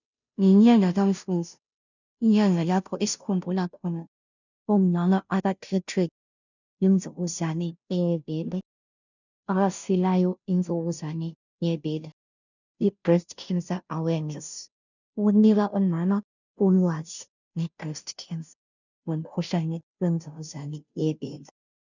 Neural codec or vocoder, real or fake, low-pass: codec, 16 kHz, 0.5 kbps, FunCodec, trained on Chinese and English, 25 frames a second; fake; 7.2 kHz